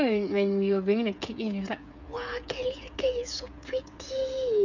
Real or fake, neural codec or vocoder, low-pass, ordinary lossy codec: fake; codec, 16 kHz, 8 kbps, FreqCodec, smaller model; 7.2 kHz; none